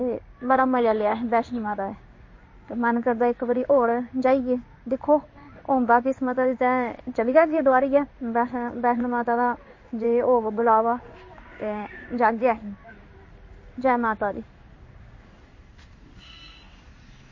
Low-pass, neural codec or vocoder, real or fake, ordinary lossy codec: 7.2 kHz; codec, 16 kHz in and 24 kHz out, 1 kbps, XY-Tokenizer; fake; MP3, 32 kbps